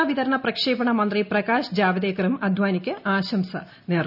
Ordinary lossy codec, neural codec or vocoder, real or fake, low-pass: none; none; real; 5.4 kHz